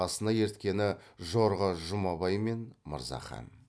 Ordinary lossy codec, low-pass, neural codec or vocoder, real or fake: none; none; none; real